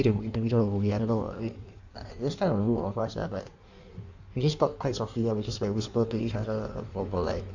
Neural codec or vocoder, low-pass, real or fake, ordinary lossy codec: codec, 16 kHz in and 24 kHz out, 1.1 kbps, FireRedTTS-2 codec; 7.2 kHz; fake; none